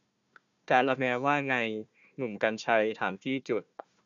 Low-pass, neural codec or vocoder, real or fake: 7.2 kHz; codec, 16 kHz, 1 kbps, FunCodec, trained on Chinese and English, 50 frames a second; fake